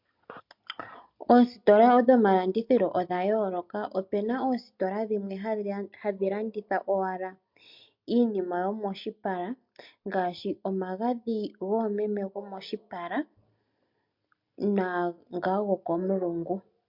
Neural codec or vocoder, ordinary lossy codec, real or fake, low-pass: none; MP3, 48 kbps; real; 5.4 kHz